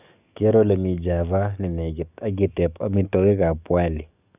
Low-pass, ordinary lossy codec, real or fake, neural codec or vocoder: 3.6 kHz; none; fake; codec, 44.1 kHz, 7.8 kbps, DAC